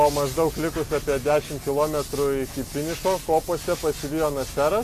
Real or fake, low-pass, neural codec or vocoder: real; 14.4 kHz; none